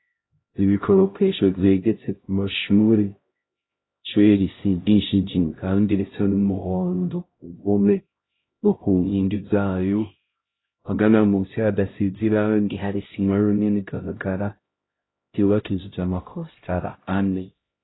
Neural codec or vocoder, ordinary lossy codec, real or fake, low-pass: codec, 16 kHz, 0.5 kbps, X-Codec, HuBERT features, trained on LibriSpeech; AAC, 16 kbps; fake; 7.2 kHz